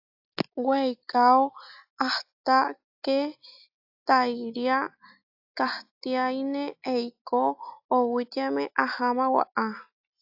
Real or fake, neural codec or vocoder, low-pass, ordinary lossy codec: real; none; 5.4 kHz; AAC, 48 kbps